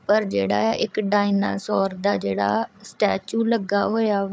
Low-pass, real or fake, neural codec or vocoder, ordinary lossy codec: none; fake; codec, 16 kHz, 8 kbps, FreqCodec, larger model; none